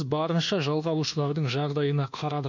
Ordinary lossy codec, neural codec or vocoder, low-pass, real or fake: none; codec, 24 kHz, 1.2 kbps, DualCodec; 7.2 kHz; fake